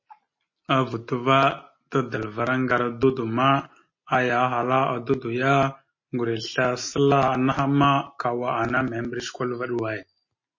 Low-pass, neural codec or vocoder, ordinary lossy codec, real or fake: 7.2 kHz; none; MP3, 32 kbps; real